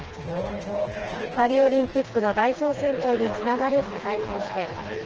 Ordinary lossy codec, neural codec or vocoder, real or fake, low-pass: Opus, 16 kbps; codec, 16 kHz, 2 kbps, FreqCodec, smaller model; fake; 7.2 kHz